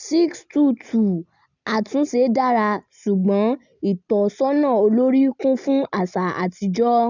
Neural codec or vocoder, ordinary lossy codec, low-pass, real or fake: none; none; 7.2 kHz; real